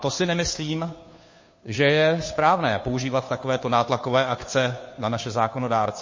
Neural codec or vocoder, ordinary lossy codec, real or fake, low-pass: codec, 16 kHz, 2 kbps, FunCodec, trained on Chinese and English, 25 frames a second; MP3, 32 kbps; fake; 7.2 kHz